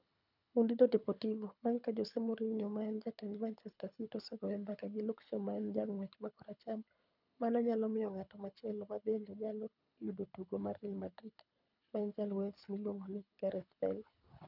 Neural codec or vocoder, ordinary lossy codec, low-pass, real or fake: codec, 24 kHz, 6 kbps, HILCodec; none; 5.4 kHz; fake